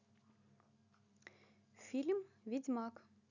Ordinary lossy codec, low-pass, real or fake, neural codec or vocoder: none; 7.2 kHz; real; none